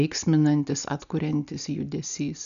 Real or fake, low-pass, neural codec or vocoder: real; 7.2 kHz; none